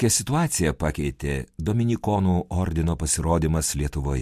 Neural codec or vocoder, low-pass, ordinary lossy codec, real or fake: none; 14.4 kHz; MP3, 64 kbps; real